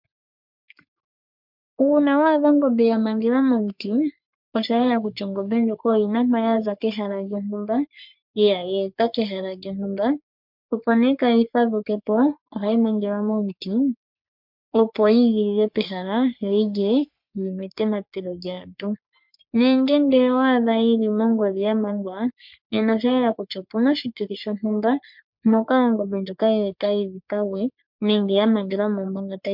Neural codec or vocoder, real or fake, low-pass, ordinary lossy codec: codec, 44.1 kHz, 3.4 kbps, Pupu-Codec; fake; 5.4 kHz; MP3, 48 kbps